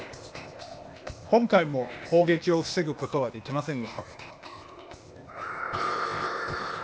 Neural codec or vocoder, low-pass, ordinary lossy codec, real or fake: codec, 16 kHz, 0.8 kbps, ZipCodec; none; none; fake